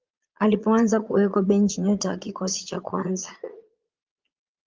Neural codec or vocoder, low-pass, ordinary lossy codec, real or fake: vocoder, 22.05 kHz, 80 mel bands, Vocos; 7.2 kHz; Opus, 32 kbps; fake